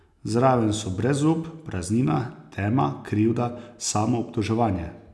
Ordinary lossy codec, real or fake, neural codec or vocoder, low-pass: none; real; none; none